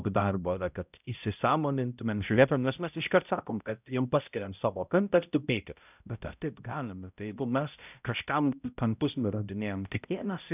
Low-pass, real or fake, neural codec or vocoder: 3.6 kHz; fake; codec, 16 kHz, 0.5 kbps, X-Codec, HuBERT features, trained on balanced general audio